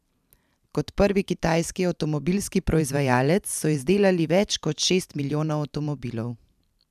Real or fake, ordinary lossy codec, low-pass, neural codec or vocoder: fake; none; 14.4 kHz; vocoder, 44.1 kHz, 128 mel bands every 512 samples, BigVGAN v2